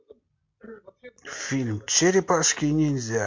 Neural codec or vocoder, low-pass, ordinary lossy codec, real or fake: none; 7.2 kHz; MP3, 48 kbps; real